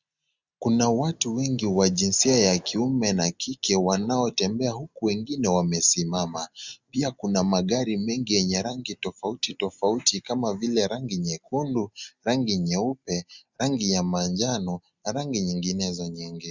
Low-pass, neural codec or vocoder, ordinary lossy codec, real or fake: 7.2 kHz; none; Opus, 64 kbps; real